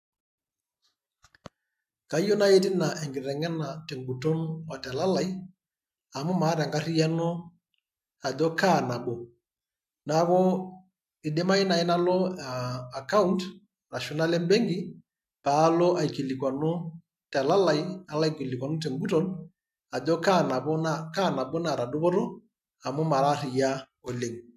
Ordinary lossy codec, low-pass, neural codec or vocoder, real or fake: MP3, 96 kbps; 14.4 kHz; none; real